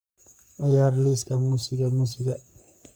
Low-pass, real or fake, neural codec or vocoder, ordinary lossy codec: none; fake; codec, 44.1 kHz, 3.4 kbps, Pupu-Codec; none